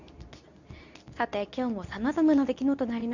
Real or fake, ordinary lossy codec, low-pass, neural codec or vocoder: fake; none; 7.2 kHz; codec, 24 kHz, 0.9 kbps, WavTokenizer, medium speech release version 2